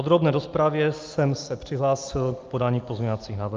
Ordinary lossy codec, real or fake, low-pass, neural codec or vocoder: Opus, 24 kbps; real; 7.2 kHz; none